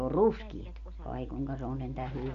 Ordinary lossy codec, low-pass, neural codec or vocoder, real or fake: none; 7.2 kHz; none; real